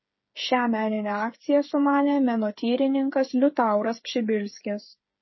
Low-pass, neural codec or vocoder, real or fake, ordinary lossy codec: 7.2 kHz; codec, 16 kHz, 8 kbps, FreqCodec, smaller model; fake; MP3, 24 kbps